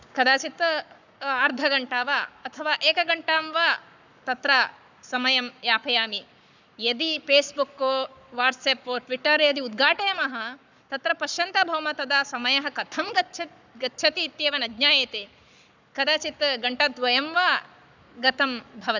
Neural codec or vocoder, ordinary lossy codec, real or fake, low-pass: codec, 44.1 kHz, 7.8 kbps, Pupu-Codec; none; fake; 7.2 kHz